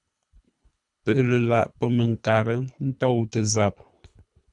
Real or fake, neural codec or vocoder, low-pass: fake; codec, 24 kHz, 3 kbps, HILCodec; 10.8 kHz